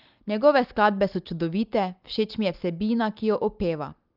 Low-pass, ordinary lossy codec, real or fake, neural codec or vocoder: 5.4 kHz; Opus, 32 kbps; real; none